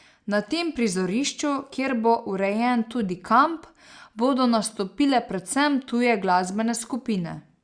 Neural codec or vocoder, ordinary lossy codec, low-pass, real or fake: none; Opus, 64 kbps; 9.9 kHz; real